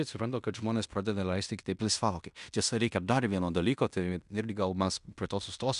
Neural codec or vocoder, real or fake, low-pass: codec, 16 kHz in and 24 kHz out, 0.9 kbps, LongCat-Audio-Codec, fine tuned four codebook decoder; fake; 10.8 kHz